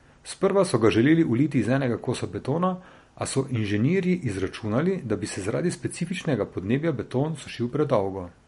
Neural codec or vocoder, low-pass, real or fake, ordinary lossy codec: none; 19.8 kHz; real; MP3, 48 kbps